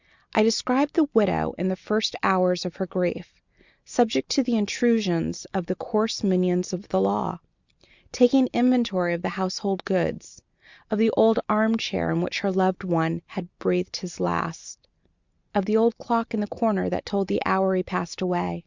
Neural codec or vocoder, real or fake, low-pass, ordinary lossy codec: none; real; 7.2 kHz; Opus, 64 kbps